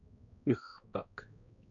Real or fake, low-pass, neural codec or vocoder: fake; 7.2 kHz; codec, 16 kHz, 1 kbps, X-Codec, HuBERT features, trained on balanced general audio